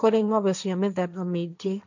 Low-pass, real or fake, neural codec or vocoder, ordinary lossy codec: 7.2 kHz; fake; codec, 16 kHz, 1.1 kbps, Voila-Tokenizer; none